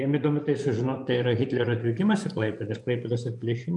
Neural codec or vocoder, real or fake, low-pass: vocoder, 24 kHz, 100 mel bands, Vocos; fake; 10.8 kHz